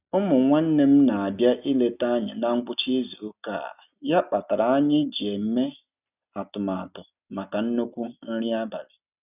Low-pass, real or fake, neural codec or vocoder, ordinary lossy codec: 3.6 kHz; real; none; none